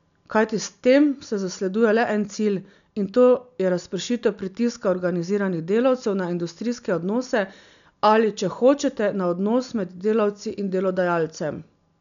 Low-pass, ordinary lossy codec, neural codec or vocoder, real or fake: 7.2 kHz; none; none; real